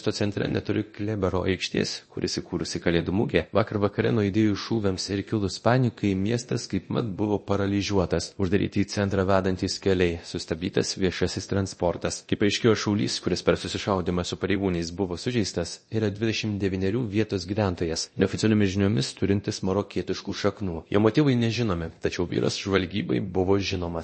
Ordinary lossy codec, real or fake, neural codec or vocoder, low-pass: MP3, 32 kbps; fake; codec, 24 kHz, 0.9 kbps, DualCodec; 10.8 kHz